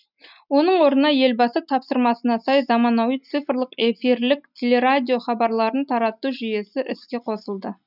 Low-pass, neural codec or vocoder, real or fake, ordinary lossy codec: 5.4 kHz; none; real; none